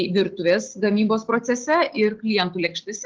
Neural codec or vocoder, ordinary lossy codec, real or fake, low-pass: none; Opus, 16 kbps; real; 7.2 kHz